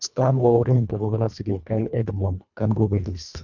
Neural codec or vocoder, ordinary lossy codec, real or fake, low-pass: codec, 24 kHz, 1.5 kbps, HILCodec; none; fake; 7.2 kHz